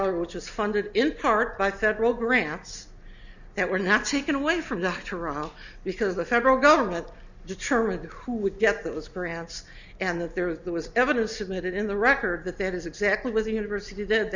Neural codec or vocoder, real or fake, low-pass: none; real; 7.2 kHz